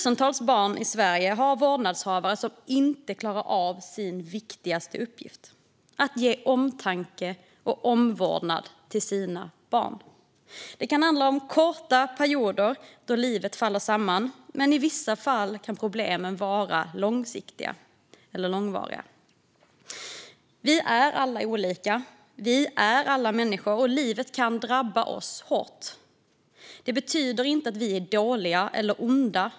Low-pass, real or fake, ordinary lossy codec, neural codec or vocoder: none; real; none; none